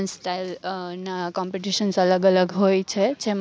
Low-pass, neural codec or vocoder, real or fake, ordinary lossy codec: none; none; real; none